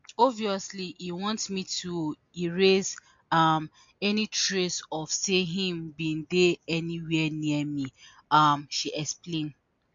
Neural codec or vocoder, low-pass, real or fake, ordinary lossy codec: none; 7.2 kHz; real; MP3, 48 kbps